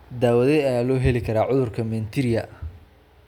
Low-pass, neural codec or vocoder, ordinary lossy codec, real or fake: 19.8 kHz; none; none; real